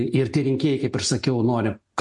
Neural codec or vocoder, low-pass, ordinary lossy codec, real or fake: none; 10.8 kHz; MP3, 48 kbps; real